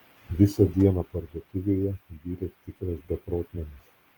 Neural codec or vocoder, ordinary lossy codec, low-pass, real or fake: vocoder, 44.1 kHz, 128 mel bands every 256 samples, BigVGAN v2; Opus, 24 kbps; 19.8 kHz; fake